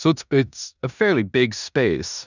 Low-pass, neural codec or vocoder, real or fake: 7.2 kHz; codec, 16 kHz in and 24 kHz out, 0.9 kbps, LongCat-Audio-Codec, four codebook decoder; fake